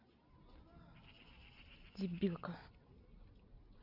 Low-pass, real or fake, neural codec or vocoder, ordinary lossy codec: 5.4 kHz; real; none; Opus, 64 kbps